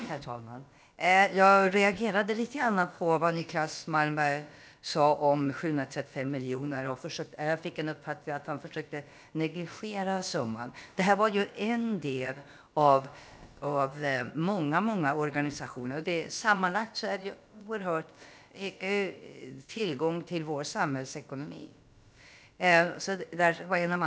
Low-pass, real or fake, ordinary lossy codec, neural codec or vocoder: none; fake; none; codec, 16 kHz, about 1 kbps, DyCAST, with the encoder's durations